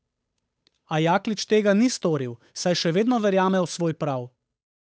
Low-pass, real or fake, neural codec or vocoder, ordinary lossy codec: none; fake; codec, 16 kHz, 8 kbps, FunCodec, trained on Chinese and English, 25 frames a second; none